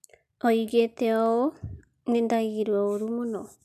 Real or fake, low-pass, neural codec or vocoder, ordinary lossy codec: real; 14.4 kHz; none; none